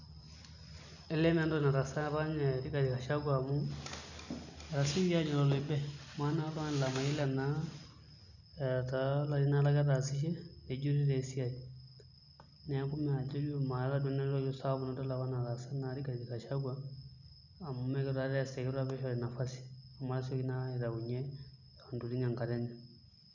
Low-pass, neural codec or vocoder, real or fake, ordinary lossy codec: 7.2 kHz; none; real; none